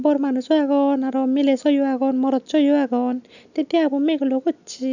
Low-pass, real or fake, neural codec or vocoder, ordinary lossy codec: 7.2 kHz; real; none; none